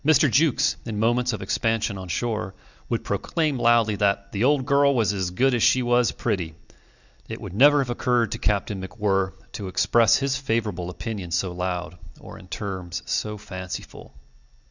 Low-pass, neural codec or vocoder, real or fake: 7.2 kHz; none; real